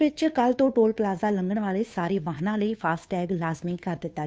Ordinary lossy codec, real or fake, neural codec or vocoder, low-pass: none; fake; codec, 16 kHz, 2 kbps, FunCodec, trained on Chinese and English, 25 frames a second; none